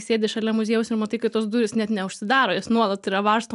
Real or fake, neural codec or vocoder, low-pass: real; none; 10.8 kHz